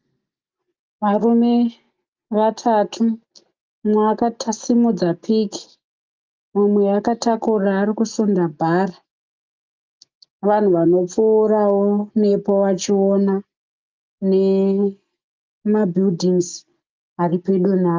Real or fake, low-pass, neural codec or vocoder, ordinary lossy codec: real; 7.2 kHz; none; Opus, 24 kbps